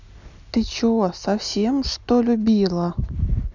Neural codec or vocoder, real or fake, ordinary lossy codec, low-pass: none; real; none; 7.2 kHz